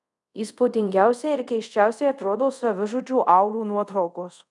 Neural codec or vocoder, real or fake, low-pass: codec, 24 kHz, 0.5 kbps, DualCodec; fake; 10.8 kHz